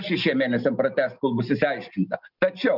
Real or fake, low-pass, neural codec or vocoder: real; 5.4 kHz; none